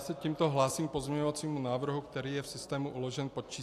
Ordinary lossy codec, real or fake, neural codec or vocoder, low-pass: AAC, 64 kbps; real; none; 14.4 kHz